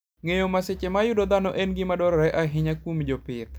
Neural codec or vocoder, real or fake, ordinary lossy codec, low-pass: none; real; none; none